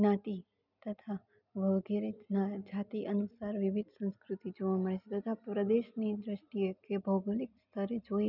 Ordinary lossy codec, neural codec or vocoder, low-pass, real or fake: none; none; 5.4 kHz; real